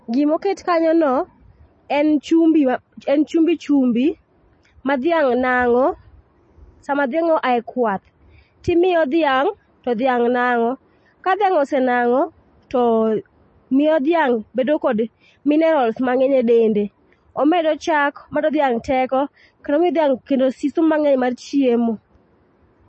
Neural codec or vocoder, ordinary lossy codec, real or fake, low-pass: none; MP3, 32 kbps; real; 9.9 kHz